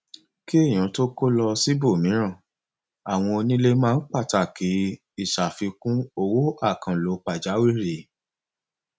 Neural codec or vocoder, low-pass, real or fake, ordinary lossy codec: none; none; real; none